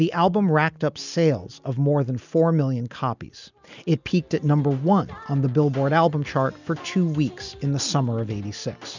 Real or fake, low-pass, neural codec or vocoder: real; 7.2 kHz; none